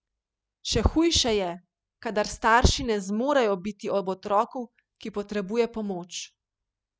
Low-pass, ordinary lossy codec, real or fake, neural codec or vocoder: none; none; real; none